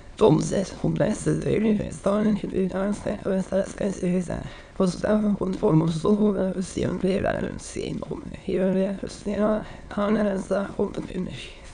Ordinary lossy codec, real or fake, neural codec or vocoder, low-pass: none; fake; autoencoder, 22.05 kHz, a latent of 192 numbers a frame, VITS, trained on many speakers; 9.9 kHz